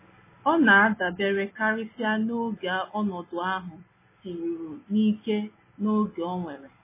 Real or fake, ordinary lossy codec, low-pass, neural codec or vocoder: real; MP3, 16 kbps; 3.6 kHz; none